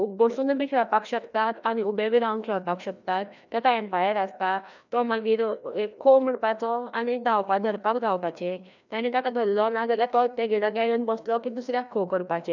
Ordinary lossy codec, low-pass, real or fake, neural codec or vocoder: none; 7.2 kHz; fake; codec, 16 kHz, 1 kbps, FreqCodec, larger model